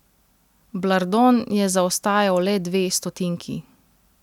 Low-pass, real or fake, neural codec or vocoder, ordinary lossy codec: 19.8 kHz; real; none; none